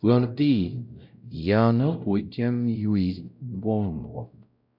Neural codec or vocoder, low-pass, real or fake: codec, 16 kHz, 0.5 kbps, X-Codec, WavLM features, trained on Multilingual LibriSpeech; 5.4 kHz; fake